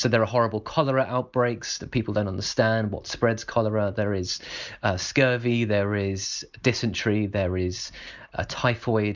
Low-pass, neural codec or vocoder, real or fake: 7.2 kHz; none; real